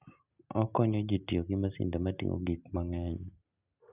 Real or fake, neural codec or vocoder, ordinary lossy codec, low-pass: real; none; Opus, 64 kbps; 3.6 kHz